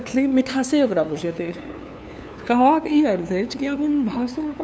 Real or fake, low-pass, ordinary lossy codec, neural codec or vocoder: fake; none; none; codec, 16 kHz, 2 kbps, FunCodec, trained on LibriTTS, 25 frames a second